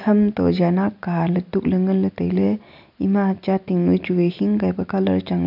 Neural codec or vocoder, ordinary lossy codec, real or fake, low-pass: none; none; real; 5.4 kHz